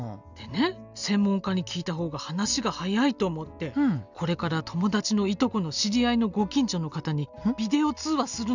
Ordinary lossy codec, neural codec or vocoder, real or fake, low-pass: none; none; real; 7.2 kHz